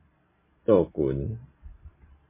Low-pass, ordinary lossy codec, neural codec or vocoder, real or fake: 3.6 kHz; MP3, 16 kbps; vocoder, 44.1 kHz, 128 mel bands every 256 samples, BigVGAN v2; fake